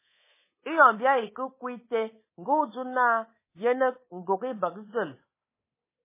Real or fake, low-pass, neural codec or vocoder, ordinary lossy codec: fake; 3.6 kHz; codec, 24 kHz, 3.1 kbps, DualCodec; MP3, 16 kbps